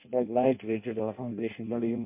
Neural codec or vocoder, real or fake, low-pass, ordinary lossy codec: codec, 16 kHz in and 24 kHz out, 0.6 kbps, FireRedTTS-2 codec; fake; 3.6 kHz; MP3, 32 kbps